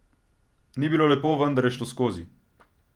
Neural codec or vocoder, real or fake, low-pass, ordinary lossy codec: vocoder, 48 kHz, 128 mel bands, Vocos; fake; 19.8 kHz; Opus, 32 kbps